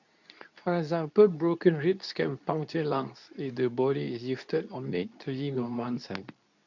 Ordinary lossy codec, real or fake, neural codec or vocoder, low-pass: none; fake; codec, 24 kHz, 0.9 kbps, WavTokenizer, medium speech release version 2; 7.2 kHz